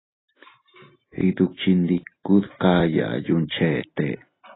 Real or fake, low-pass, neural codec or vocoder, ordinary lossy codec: real; 7.2 kHz; none; AAC, 16 kbps